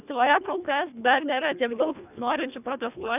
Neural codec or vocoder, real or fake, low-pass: codec, 24 kHz, 1.5 kbps, HILCodec; fake; 3.6 kHz